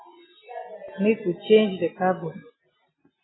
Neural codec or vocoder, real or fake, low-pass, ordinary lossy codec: none; real; 7.2 kHz; AAC, 16 kbps